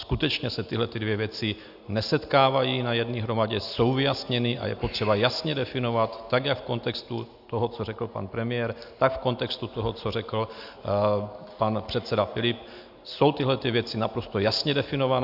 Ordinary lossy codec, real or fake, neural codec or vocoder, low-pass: AAC, 48 kbps; real; none; 5.4 kHz